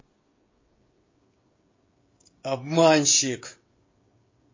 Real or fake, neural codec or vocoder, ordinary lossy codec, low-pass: fake; codec, 16 kHz, 16 kbps, FreqCodec, smaller model; MP3, 32 kbps; 7.2 kHz